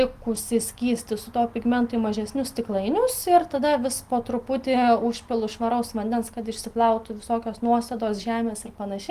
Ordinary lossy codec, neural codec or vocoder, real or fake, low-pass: Opus, 24 kbps; none; real; 14.4 kHz